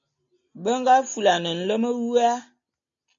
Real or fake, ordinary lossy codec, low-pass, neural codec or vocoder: real; AAC, 64 kbps; 7.2 kHz; none